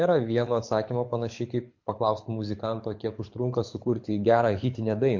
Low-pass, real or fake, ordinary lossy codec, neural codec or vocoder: 7.2 kHz; fake; MP3, 48 kbps; codec, 24 kHz, 6 kbps, HILCodec